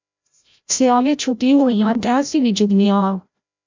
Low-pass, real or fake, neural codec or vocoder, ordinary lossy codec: 7.2 kHz; fake; codec, 16 kHz, 0.5 kbps, FreqCodec, larger model; MP3, 64 kbps